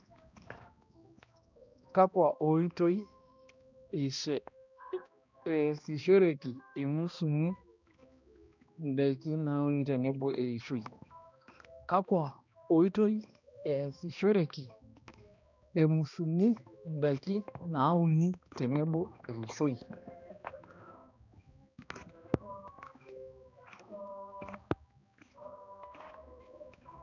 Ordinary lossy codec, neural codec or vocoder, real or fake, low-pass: none; codec, 16 kHz, 1 kbps, X-Codec, HuBERT features, trained on balanced general audio; fake; 7.2 kHz